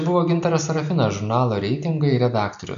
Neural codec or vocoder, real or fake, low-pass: none; real; 7.2 kHz